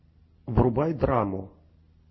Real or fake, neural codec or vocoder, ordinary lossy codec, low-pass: real; none; MP3, 24 kbps; 7.2 kHz